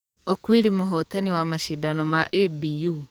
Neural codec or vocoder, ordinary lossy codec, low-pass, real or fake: codec, 44.1 kHz, 2.6 kbps, SNAC; none; none; fake